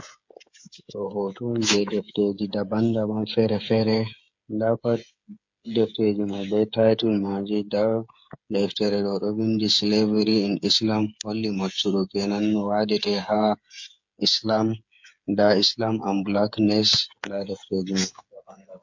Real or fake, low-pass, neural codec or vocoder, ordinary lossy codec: fake; 7.2 kHz; codec, 16 kHz, 8 kbps, FreqCodec, smaller model; MP3, 48 kbps